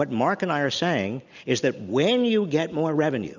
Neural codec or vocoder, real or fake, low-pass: none; real; 7.2 kHz